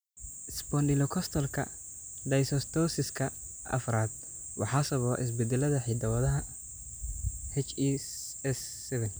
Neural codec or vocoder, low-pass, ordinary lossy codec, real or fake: none; none; none; real